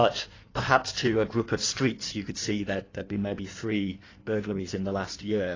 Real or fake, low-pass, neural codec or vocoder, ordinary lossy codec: fake; 7.2 kHz; codec, 16 kHz in and 24 kHz out, 1.1 kbps, FireRedTTS-2 codec; AAC, 32 kbps